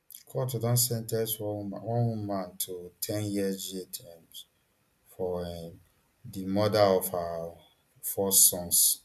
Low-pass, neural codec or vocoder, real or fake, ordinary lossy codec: 14.4 kHz; none; real; none